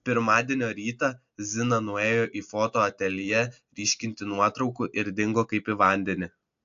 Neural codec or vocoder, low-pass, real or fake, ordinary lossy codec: none; 7.2 kHz; real; AAC, 64 kbps